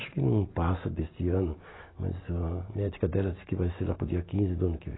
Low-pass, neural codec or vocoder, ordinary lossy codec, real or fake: 7.2 kHz; none; AAC, 16 kbps; real